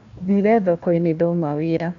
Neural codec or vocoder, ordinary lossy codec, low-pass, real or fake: codec, 16 kHz, 1 kbps, FunCodec, trained on Chinese and English, 50 frames a second; none; 7.2 kHz; fake